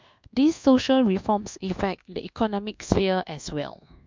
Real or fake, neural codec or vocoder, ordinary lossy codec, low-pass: fake; codec, 24 kHz, 1.2 kbps, DualCodec; MP3, 64 kbps; 7.2 kHz